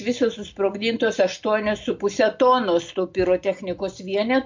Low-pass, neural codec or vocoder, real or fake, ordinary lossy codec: 7.2 kHz; none; real; MP3, 48 kbps